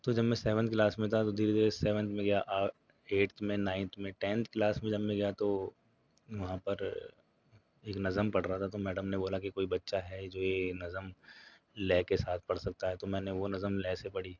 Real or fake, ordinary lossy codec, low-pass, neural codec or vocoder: real; none; 7.2 kHz; none